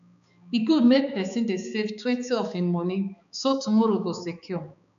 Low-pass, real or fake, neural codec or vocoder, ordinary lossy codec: 7.2 kHz; fake; codec, 16 kHz, 4 kbps, X-Codec, HuBERT features, trained on balanced general audio; none